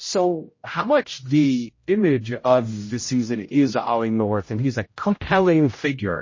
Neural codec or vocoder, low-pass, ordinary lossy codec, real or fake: codec, 16 kHz, 0.5 kbps, X-Codec, HuBERT features, trained on general audio; 7.2 kHz; MP3, 32 kbps; fake